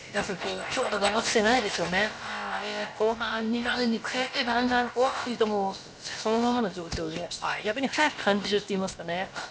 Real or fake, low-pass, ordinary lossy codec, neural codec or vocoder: fake; none; none; codec, 16 kHz, about 1 kbps, DyCAST, with the encoder's durations